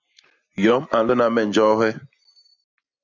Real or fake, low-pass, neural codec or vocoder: real; 7.2 kHz; none